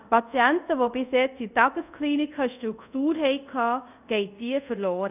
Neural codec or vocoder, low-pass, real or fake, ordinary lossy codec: codec, 24 kHz, 0.5 kbps, DualCodec; 3.6 kHz; fake; none